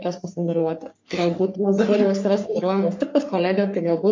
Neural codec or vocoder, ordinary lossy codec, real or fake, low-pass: codec, 44.1 kHz, 3.4 kbps, Pupu-Codec; MP3, 48 kbps; fake; 7.2 kHz